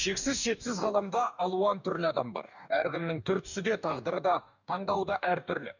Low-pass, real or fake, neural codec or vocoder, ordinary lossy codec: 7.2 kHz; fake; codec, 44.1 kHz, 2.6 kbps, DAC; none